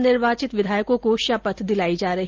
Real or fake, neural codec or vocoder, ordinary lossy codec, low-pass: real; none; Opus, 32 kbps; 7.2 kHz